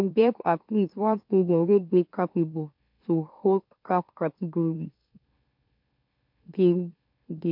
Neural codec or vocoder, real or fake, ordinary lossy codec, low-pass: autoencoder, 44.1 kHz, a latent of 192 numbers a frame, MeloTTS; fake; MP3, 48 kbps; 5.4 kHz